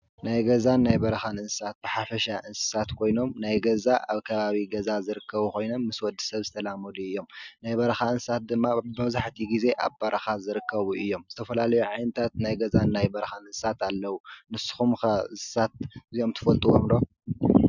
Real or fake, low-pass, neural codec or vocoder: real; 7.2 kHz; none